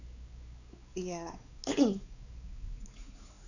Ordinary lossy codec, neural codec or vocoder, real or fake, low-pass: none; codec, 16 kHz, 4 kbps, X-Codec, WavLM features, trained on Multilingual LibriSpeech; fake; 7.2 kHz